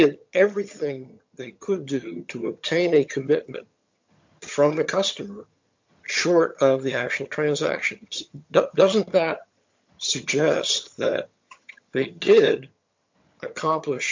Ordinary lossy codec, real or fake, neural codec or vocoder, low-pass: MP3, 48 kbps; fake; vocoder, 22.05 kHz, 80 mel bands, HiFi-GAN; 7.2 kHz